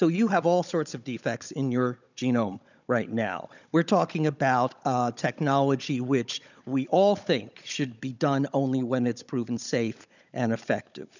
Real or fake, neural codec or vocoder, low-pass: fake; codec, 16 kHz, 16 kbps, FunCodec, trained on Chinese and English, 50 frames a second; 7.2 kHz